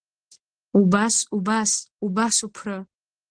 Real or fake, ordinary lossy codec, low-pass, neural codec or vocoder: real; Opus, 16 kbps; 9.9 kHz; none